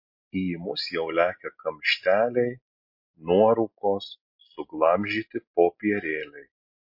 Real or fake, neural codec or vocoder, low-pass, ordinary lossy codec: real; none; 5.4 kHz; MP3, 32 kbps